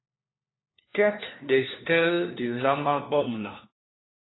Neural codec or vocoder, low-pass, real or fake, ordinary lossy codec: codec, 16 kHz, 1 kbps, FunCodec, trained on LibriTTS, 50 frames a second; 7.2 kHz; fake; AAC, 16 kbps